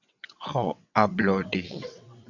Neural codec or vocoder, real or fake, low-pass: vocoder, 22.05 kHz, 80 mel bands, WaveNeXt; fake; 7.2 kHz